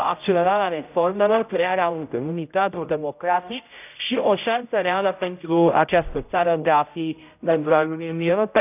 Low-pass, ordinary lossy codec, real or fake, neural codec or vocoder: 3.6 kHz; none; fake; codec, 16 kHz, 0.5 kbps, X-Codec, HuBERT features, trained on general audio